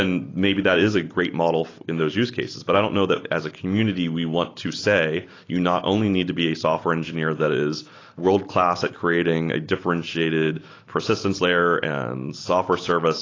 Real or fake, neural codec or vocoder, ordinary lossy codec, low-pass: real; none; AAC, 32 kbps; 7.2 kHz